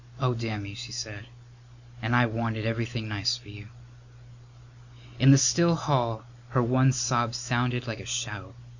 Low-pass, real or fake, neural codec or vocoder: 7.2 kHz; real; none